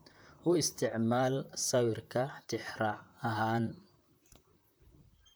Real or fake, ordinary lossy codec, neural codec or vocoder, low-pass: fake; none; vocoder, 44.1 kHz, 128 mel bands, Pupu-Vocoder; none